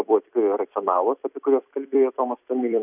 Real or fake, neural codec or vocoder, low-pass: fake; vocoder, 44.1 kHz, 128 mel bands every 256 samples, BigVGAN v2; 3.6 kHz